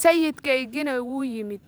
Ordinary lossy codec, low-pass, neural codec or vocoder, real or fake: none; none; vocoder, 44.1 kHz, 128 mel bands every 512 samples, BigVGAN v2; fake